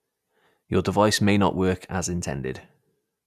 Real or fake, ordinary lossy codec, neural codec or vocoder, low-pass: real; none; none; 14.4 kHz